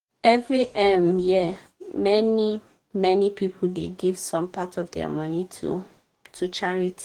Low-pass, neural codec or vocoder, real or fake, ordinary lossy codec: 19.8 kHz; codec, 44.1 kHz, 2.6 kbps, DAC; fake; Opus, 24 kbps